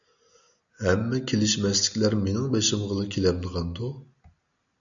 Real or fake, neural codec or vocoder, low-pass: real; none; 7.2 kHz